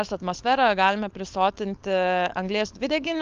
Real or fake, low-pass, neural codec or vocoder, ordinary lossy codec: fake; 7.2 kHz; codec, 16 kHz, 4.8 kbps, FACodec; Opus, 32 kbps